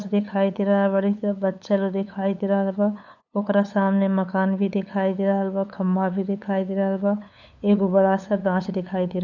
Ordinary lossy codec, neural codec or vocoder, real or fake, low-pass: none; codec, 16 kHz, 8 kbps, FunCodec, trained on LibriTTS, 25 frames a second; fake; 7.2 kHz